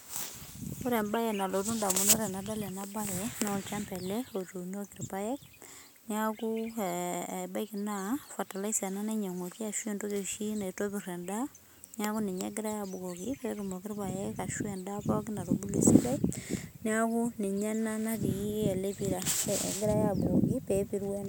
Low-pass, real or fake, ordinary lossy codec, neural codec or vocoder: none; real; none; none